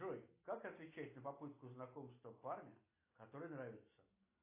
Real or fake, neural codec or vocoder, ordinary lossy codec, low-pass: real; none; AAC, 24 kbps; 3.6 kHz